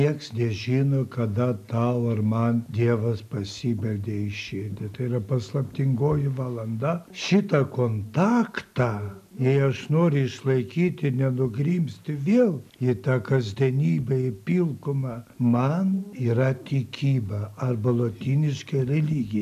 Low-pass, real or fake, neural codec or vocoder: 14.4 kHz; real; none